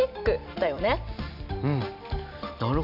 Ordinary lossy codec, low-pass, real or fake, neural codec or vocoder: none; 5.4 kHz; real; none